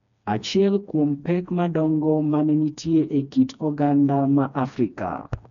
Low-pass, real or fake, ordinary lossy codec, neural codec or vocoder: 7.2 kHz; fake; none; codec, 16 kHz, 2 kbps, FreqCodec, smaller model